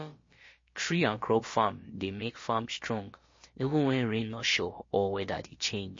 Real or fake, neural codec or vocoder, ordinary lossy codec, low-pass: fake; codec, 16 kHz, about 1 kbps, DyCAST, with the encoder's durations; MP3, 32 kbps; 7.2 kHz